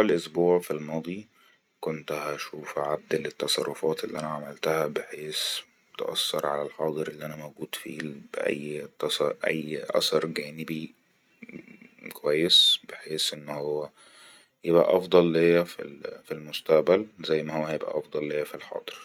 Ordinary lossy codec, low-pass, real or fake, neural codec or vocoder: none; 19.8 kHz; real; none